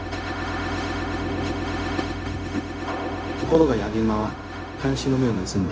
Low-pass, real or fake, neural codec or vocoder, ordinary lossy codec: none; fake; codec, 16 kHz, 0.4 kbps, LongCat-Audio-Codec; none